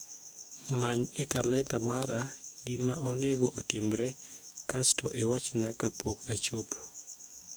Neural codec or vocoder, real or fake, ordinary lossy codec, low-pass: codec, 44.1 kHz, 2.6 kbps, DAC; fake; none; none